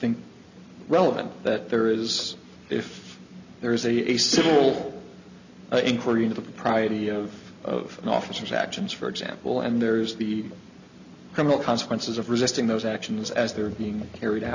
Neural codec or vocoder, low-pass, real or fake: none; 7.2 kHz; real